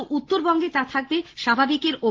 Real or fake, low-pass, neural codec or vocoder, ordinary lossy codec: real; 7.2 kHz; none; Opus, 16 kbps